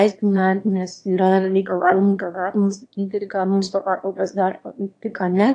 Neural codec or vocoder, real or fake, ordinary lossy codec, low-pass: autoencoder, 22.05 kHz, a latent of 192 numbers a frame, VITS, trained on one speaker; fake; AAC, 48 kbps; 9.9 kHz